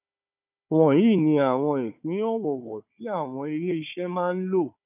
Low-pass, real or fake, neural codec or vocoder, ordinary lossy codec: 3.6 kHz; fake; codec, 16 kHz, 4 kbps, FunCodec, trained on Chinese and English, 50 frames a second; none